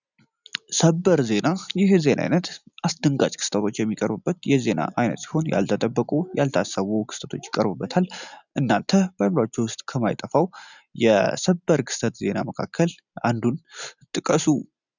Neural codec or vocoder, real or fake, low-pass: none; real; 7.2 kHz